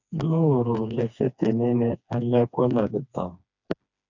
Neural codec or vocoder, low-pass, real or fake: codec, 16 kHz, 2 kbps, FreqCodec, smaller model; 7.2 kHz; fake